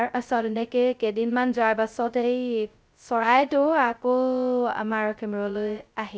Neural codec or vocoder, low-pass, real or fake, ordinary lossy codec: codec, 16 kHz, 0.2 kbps, FocalCodec; none; fake; none